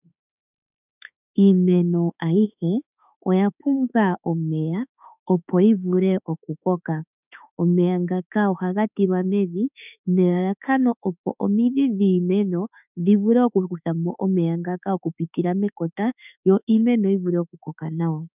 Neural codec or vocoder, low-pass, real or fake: autoencoder, 48 kHz, 32 numbers a frame, DAC-VAE, trained on Japanese speech; 3.6 kHz; fake